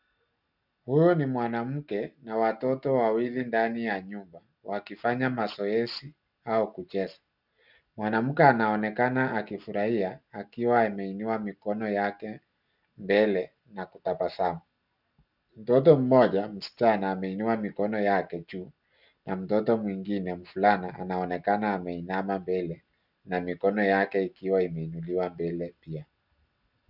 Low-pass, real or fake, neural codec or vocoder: 5.4 kHz; real; none